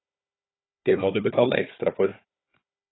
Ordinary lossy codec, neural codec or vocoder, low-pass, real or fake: AAC, 16 kbps; codec, 16 kHz, 4 kbps, FunCodec, trained on Chinese and English, 50 frames a second; 7.2 kHz; fake